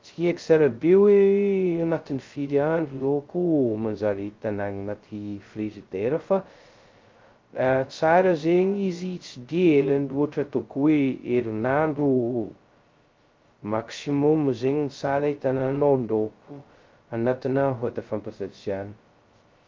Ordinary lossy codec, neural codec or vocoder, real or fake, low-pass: Opus, 32 kbps; codec, 16 kHz, 0.2 kbps, FocalCodec; fake; 7.2 kHz